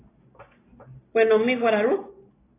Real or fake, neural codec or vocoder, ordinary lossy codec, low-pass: real; none; AAC, 16 kbps; 3.6 kHz